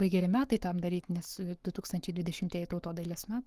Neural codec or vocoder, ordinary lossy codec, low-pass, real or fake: codec, 44.1 kHz, 7.8 kbps, Pupu-Codec; Opus, 24 kbps; 14.4 kHz; fake